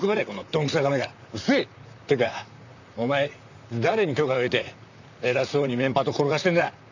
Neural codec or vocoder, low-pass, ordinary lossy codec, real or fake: vocoder, 44.1 kHz, 128 mel bands, Pupu-Vocoder; 7.2 kHz; none; fake